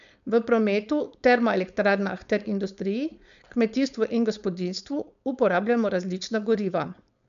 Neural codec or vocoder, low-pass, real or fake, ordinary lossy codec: codec, 16 kHz, 4.8 kbps, FACodec; 7.2 kHz; fake; MP3, 96 kbps